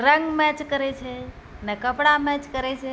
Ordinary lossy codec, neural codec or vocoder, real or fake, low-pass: none; none; real; none